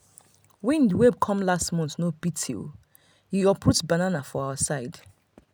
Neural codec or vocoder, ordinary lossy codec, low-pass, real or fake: none; none; none; real